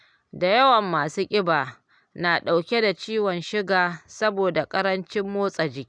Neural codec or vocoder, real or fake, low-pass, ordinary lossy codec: none; real; 9.9 kHz; none